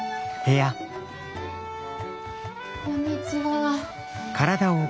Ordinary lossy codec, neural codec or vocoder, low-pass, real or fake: none; none; none; real